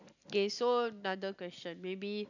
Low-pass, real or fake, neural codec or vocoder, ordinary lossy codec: 7.2 kHz; real; none; none